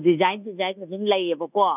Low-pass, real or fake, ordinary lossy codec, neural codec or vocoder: 3.6 kHz; fake; none; codec, 24 kHz, 1.2 kbps, DualCodec